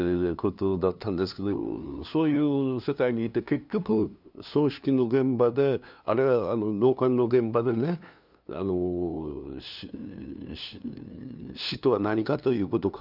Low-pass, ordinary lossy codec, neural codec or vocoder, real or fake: 5.4 kHz; none; codec, 16 kHz, 2 kbps, FunCodec, trained on LibriTTS, 25 frames a second; fake